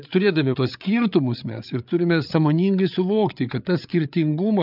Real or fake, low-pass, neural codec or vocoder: fake; 5.4 kHz; codec, 16 kHz, 8 kbps, FreqCodec, larger model